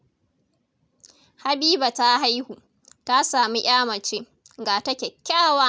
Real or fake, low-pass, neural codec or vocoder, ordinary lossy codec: real; none; none; none